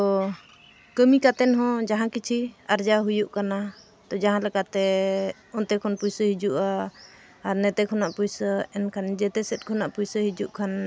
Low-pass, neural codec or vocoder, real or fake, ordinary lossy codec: none; none; real; none